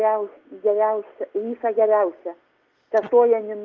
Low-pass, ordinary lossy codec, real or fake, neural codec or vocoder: 7.2 kHz; Opus, 32 kbps; real; none